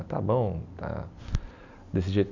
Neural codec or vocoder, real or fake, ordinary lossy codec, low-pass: none; real; none; 7.2 kHz